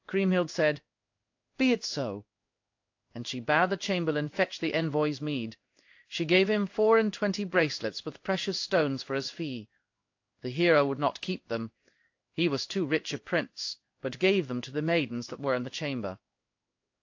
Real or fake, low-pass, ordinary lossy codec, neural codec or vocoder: fake; 7.2 kHz; AAC, 48 kbps; codec, 16 kHz in and 24 kHz out, 1 kbps, XY-Tokenizer